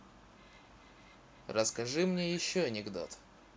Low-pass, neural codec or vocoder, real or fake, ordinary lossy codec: none; none; real; none